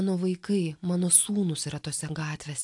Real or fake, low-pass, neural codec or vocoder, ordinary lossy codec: real; 10.8 kHz; none; AAC, 64 kbps